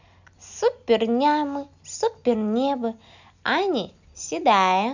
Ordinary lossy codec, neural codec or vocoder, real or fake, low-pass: none; none; real; 7.2 kHz